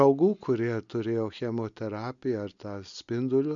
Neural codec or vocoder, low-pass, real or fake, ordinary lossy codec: none; 7.2 kHz; real; MP3, 64 kbps